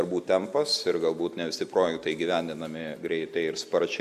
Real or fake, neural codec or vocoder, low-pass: real; none; 14.4 kHz